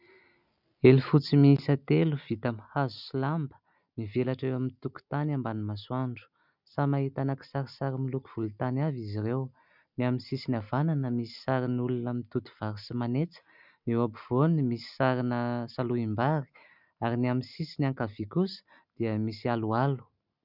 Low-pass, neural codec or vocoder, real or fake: 5.4 kHz; none; real